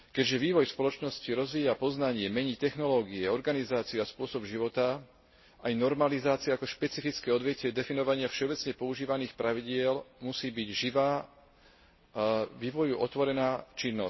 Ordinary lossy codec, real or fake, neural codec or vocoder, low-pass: MP3, 24 kbps; real; none; 7.2 kHz